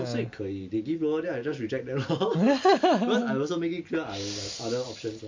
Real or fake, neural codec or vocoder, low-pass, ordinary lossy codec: real; none; 7.2 kHz; MP3, 48 kbps